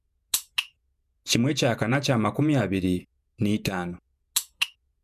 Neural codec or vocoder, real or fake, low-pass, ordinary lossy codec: vocoder, 48 kHz, 128 mel bands, Vocos; fake; 14.4 kHz; MP3, 96 kbps